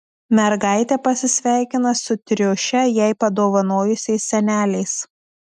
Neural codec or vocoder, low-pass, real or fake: none; 14.4 kHz; real